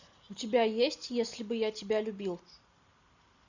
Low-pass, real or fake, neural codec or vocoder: 7.2 kHz; real; none